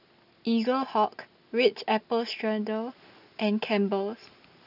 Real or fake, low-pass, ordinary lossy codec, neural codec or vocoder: real; 5.4 kHz; none; none